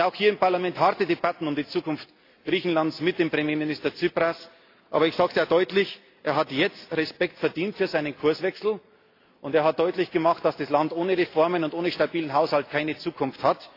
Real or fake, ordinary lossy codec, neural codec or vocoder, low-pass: real; AAC, 32 kbps; none; 5.4 kHz